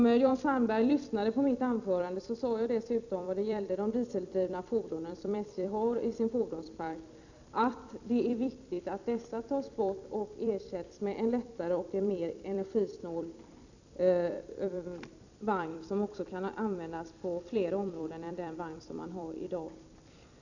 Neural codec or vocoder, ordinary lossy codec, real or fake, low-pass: vocoder, 44.1 kHz, 128 mel bands every 256 samples, BigVGAN v2; none; fake; 7.2 kHz